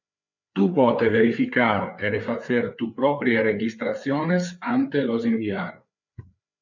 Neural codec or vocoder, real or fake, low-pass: codec, 16 kHz, 4 kbps, FreqCodec, larger model; fake; 7.2 kHz